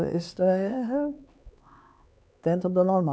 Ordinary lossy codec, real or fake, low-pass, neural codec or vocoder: none; fake; none; codec, 16 kHz, 4 kbps, X-Codec, HuBERT features, trained on LibriSpeech